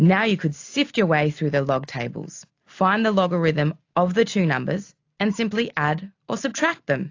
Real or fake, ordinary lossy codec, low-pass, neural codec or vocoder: real; AAC, 48 kbps; 7.2 kHz; none